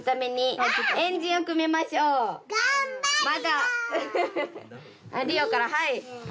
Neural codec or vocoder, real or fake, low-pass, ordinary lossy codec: none; real; none; none